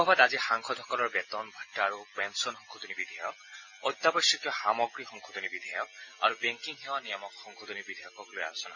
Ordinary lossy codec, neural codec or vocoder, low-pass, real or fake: MP3, 64 kbps; none; 7.2 kHz; real